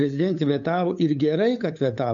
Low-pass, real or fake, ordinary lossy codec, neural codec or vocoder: 7.2 kHz; fake; MP3, 64 kbps; codec, 16 kHz, 8 kbps, FunCodec, trained on LibriTTS, 25 frames a second